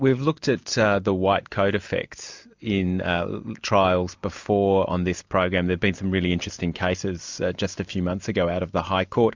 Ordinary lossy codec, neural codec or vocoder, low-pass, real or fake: MP3, 64 kbps; none; 7.2 kHz; real